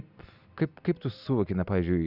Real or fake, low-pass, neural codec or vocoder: real; 5.4 kHz; none